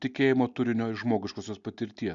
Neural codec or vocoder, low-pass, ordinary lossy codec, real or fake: none; 7.2 kHz; Opus, 64 kbps; real